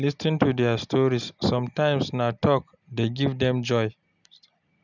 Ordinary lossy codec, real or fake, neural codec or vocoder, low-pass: none; real; none; 7.2 kHz